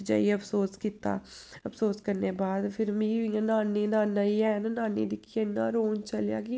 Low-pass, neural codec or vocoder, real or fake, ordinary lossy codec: none; none; real; none